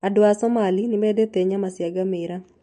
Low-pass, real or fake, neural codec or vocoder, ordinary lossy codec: 14.4 kHz; real; none; MP3, 48 kbps